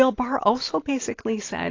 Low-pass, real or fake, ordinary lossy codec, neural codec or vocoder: 7.2 kHz; real; AAC, 32 kbps; none